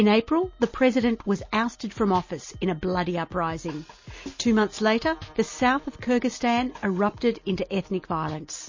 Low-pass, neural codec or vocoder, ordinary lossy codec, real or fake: 7.2 kHz; none; MP3, 32 kbps; real